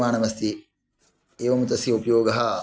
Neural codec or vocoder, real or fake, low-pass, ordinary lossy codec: none; real; none; none